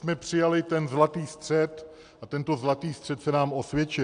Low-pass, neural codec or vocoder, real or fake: 9.9 kHz; none; real